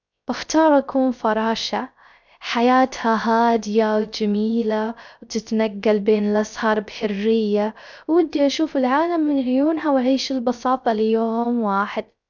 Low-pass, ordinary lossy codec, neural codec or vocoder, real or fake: 7.2 kHz; none; codec, 16 kHz, 0.3 kbps, FocalCodec; fake